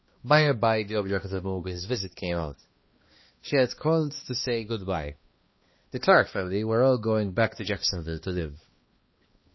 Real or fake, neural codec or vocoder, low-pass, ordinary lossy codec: fake; codec, 16 kHz, 2 kbps, X-Codec, HuBERT features, trained on balanced general audio; 7.2 kHz; MP3, 24 kbps